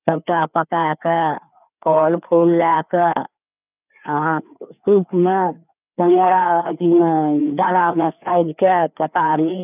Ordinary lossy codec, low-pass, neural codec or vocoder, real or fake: none; 3.6 kHz; codec, 16 kHz, 2 kbps, FreqCodec, larger model; fake